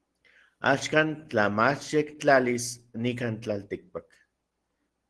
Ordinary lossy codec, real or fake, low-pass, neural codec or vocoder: Opus, 16 kbps; real; 10.8 kHz; none